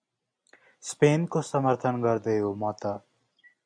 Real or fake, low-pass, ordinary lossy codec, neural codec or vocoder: real; 9.9 kHz; MP3, 96 kbps; none